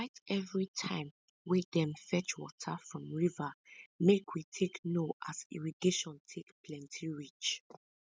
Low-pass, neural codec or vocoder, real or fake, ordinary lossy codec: none; none; real; none